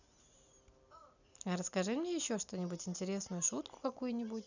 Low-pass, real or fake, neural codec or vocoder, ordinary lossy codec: 7.2 kHz; real; none; none